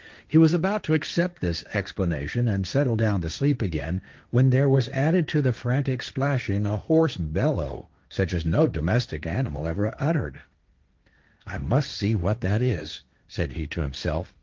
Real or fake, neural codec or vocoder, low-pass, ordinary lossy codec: fake; codec, 16 kHz, 1.1 kbps, Voila-Tokenizer; 7.2 kHz; Opus, 32 kbps